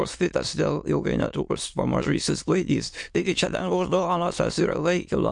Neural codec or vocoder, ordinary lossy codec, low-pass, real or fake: autoencoder, 22.05 kHz, a latent of 192 numbers a frame, VITS, trained on many speakers; MP3, 64 kbps; 9.9 kHz; fake